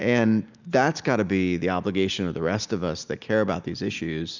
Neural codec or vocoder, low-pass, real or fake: none; 7.2 kHz; real